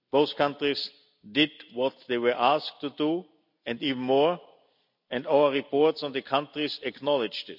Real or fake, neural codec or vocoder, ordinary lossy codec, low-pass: real; none; none; 5.4 kHz